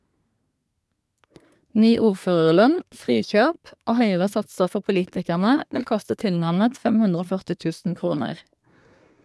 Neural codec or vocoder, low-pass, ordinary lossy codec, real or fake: codec, 24 kHz, 1 kbps, SNAC; none; none; fake